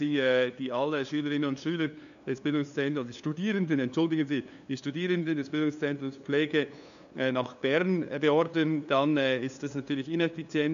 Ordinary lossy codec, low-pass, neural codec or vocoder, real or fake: none; 7.2 kHz; codec, 16 kHz, 2 kbps, FunCodec, trained on LibriTTS, 25 frames a second; fake